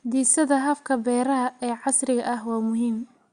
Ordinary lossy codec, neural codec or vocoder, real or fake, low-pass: Opus, 64 kbps; none; real; 9.9 kHz